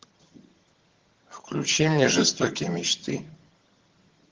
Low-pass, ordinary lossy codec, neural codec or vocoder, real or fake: 7.2 kHz; Opus, 16 kbps; vocoder, 22.05 kHz, 80 mel bands, HiFi-GAN; fake